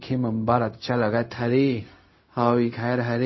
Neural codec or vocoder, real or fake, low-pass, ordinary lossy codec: codec, 16 kHz, 0.4 kbps, LongCat-Audio-Codec; fake; 7.2 kHz; MP3, 24 kbps